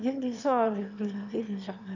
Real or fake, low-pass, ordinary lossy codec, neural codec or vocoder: fake; 7.2 kHz; none; autoencoder, 22.05 kHz, a latent of 192 numbers a frame, VITS, trained on one speaker